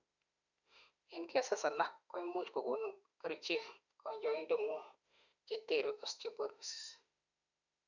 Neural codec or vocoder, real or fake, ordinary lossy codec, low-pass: autoencoder, 48 kHz, 32 numbers a frame, DAC-VAE, trained on Japanese speech; fake; none; 7.2 kHz